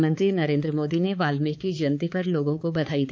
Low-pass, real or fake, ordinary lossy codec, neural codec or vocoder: none; fake; none; codec, 16 kHz, 4 kbps, X-Codec, HuBERT features, trained on balanced general audio